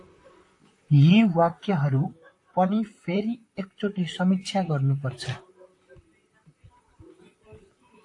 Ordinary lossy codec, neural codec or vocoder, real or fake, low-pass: AAC, 48 kbps; vocoder, 44.1 kHz, 128 mel bands, Pupu-Vocoder; fake; 10.8 kHz